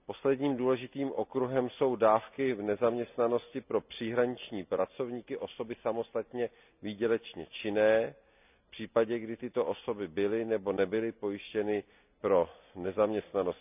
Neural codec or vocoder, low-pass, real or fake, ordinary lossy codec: none; 3.6 kHz; real; none